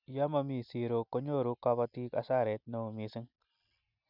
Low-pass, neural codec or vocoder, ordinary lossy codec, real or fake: 5.4 kHz; none; none; real